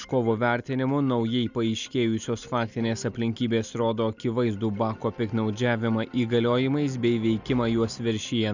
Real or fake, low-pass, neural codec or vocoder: real; 7.2 kHz; none